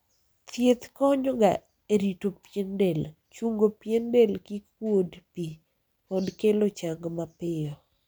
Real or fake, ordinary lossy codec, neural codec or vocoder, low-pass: real; none; none; none